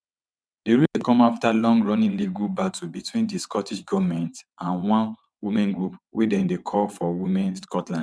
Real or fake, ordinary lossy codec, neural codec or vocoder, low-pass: fake; none; vocoder, 22.05 kHz, 80 mel bands, WaveNeXt; none